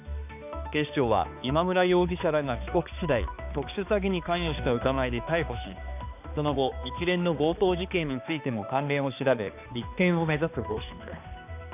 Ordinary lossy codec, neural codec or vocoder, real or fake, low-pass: none; codec, 16 kHz, 2 kbps, X-Codec, HuBERT features, trained on balanced general audio; fake; 3.6 kHz